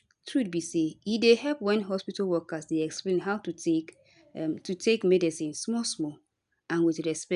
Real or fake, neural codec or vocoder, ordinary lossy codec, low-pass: real; none; none; 10.8 kHz